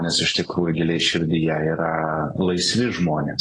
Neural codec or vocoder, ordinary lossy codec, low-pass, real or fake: none; AAC, 32 kbps; 10.8 kHz; real